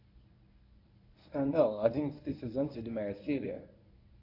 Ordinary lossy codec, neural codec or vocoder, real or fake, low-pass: AAC, 32 kbps; codec, 24 kHz, 0.9 kbps, WavTokenizer, medium speech release version 1; fake; 5.4 kHz